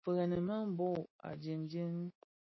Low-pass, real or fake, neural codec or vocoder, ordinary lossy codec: 7.2 kHz; real; none; MP3, 24 kbps